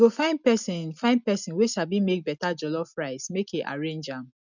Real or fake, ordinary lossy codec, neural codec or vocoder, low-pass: real; none; none; 7.2 kHz